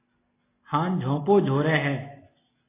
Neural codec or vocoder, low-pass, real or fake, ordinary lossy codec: none; 3.6 kHz; real; AAC, 16 kbps